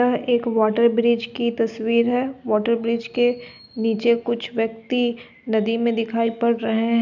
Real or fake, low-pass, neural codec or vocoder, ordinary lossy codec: real; 7.2 kHz; none; none